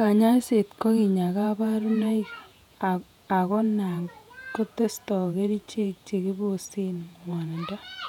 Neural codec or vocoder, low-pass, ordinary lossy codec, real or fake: vocoder, 48 kHz, 128 mel bands, Vocos; 19.8 kHz; none; fake